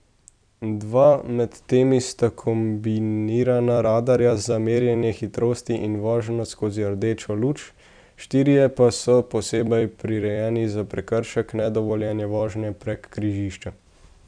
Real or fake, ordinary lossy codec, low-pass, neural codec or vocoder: fake; none; 9.9 kHz; vocoder, 44.1 kHz, 128 mel bands every 256 samples, BigVGAN v2